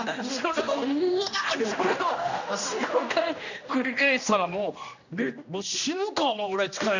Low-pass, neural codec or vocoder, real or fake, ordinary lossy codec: 7.2 kHz; codec, 16 kHz, 1 kbps, X-Codec, HuBERT features, trained on general audio; fake; none